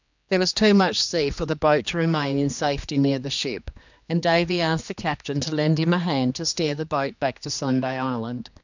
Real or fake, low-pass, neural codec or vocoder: fake; 7.2 kHz; codec, 16 kHz, 2 kbps, X-Codec, HuBERT features, trained on general audio